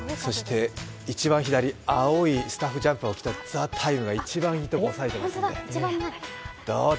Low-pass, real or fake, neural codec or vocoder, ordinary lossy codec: none; real; none; none